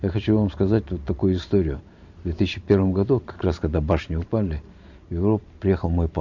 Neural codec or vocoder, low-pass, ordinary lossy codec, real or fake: vocoder, 44.1 kHz, 128 mel bands every 512 samples, BigVGAN v2; 7.2 kHz; none; fake